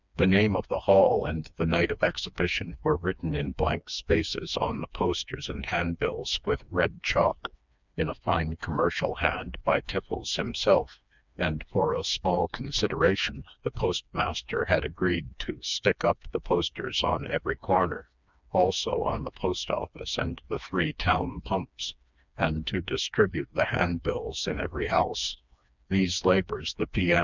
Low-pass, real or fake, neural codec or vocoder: 7.2 kHz; fake; codec, 16 kHz, 2 kbps, FreqCodec, smaller model